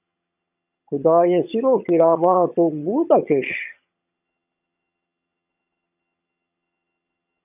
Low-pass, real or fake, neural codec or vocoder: 3.6 kHz; fake; vocoder, 22.05 kHz, 80 mel bands, HiFi-GAN